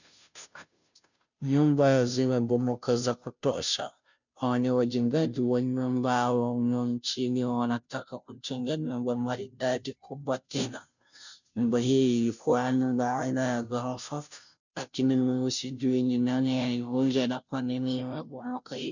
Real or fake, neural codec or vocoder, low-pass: fake; codec, 16 kHz, 0.5 kbps, FunCodec, trained on Chinese and English, 25 frames a second; 7.2 kHz